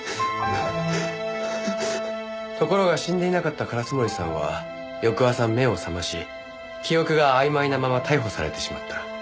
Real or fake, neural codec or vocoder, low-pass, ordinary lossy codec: real; none; none; none